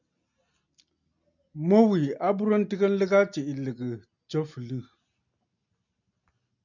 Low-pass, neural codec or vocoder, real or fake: 7.2 kHz; none; real